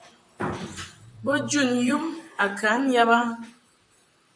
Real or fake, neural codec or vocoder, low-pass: fake; vocoder, 44.1 kHz, 128 mel bands, Pupu-Vocoder; 9.9 kHz